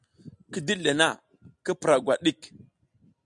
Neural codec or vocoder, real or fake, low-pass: none; real; 10.8 kHz